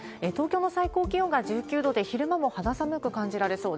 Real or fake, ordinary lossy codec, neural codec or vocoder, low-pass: real; none; none; none